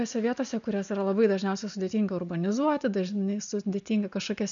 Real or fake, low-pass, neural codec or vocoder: real; 7.2 kHz; none